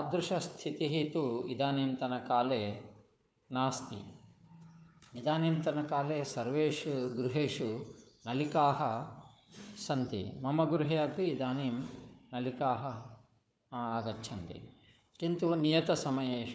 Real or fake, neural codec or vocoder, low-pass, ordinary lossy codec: fake; codec, 16 kHz, 4 kbps, FunCodec, trained on Chinese and English, 50 frames a second; none; none